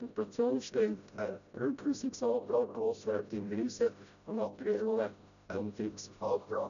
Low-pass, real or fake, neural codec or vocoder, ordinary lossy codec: 7.2 kHz; fake; codec, 16 kHz, 0.5 kbps, FreqCodec, smaller model; none